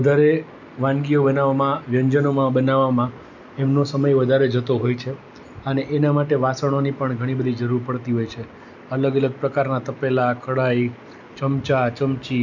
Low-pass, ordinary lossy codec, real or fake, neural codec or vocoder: 7.2 kHz; none; real; none